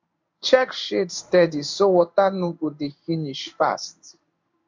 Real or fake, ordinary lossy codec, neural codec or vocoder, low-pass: fake; MP3, 48 kbps; codec, 16 kHz in and 24 kHz out, 1 kbps, XY-Tokenizer; 7.2 kHz